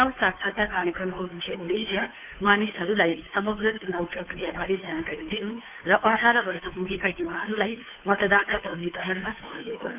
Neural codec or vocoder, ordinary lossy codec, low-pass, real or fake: codec, 16 kHz, 2 kbps, FunCodec, trained on Chinese and English, 25 frames a second; none; 3.6 kHz; fake